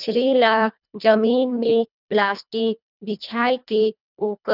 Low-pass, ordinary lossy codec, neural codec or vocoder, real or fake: 5.4 kHz; none; codec, 24 kHz, 1.5 kbps, HILCodec; fake